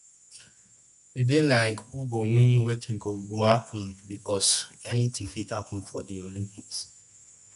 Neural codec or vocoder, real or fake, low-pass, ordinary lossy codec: codec, 24 kHz, 0.9 kbps, WavTokenizer, medium music audio release; fake; 10.8 kHz; none